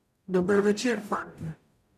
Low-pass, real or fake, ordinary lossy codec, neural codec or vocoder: 14.4 kHz; fake; none; codec, 44.1 kHz, 0.9 kbps, DAC